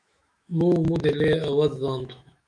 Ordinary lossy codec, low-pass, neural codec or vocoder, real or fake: MP3, 96 kbps; 9.9 kHz; autoencoder, 48 kHz, 128 numbers a frame, DAC-VAE, trained on Japanese speech; fake